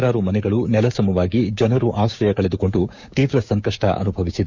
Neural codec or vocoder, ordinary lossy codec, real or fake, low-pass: codec, 16 kHz, 6 kbps, DAC; none; fake; 7.2 kHz